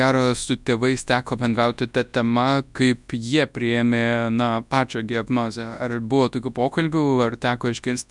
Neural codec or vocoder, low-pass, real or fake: codec, 24 kHz, 0.9 kbps, WavTokenizer, large speech release; 10.8 kHz; fake